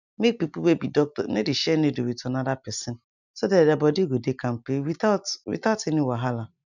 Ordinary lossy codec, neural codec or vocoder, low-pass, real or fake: none; none; 7.2 kHz; real